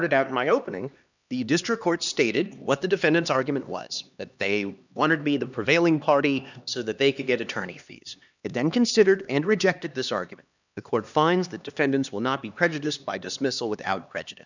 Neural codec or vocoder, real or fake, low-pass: codec, 16 kHz, 2 kbps, X-Codec, HuBERT features, trained on LibriSpeech; fake; 7.2 kHz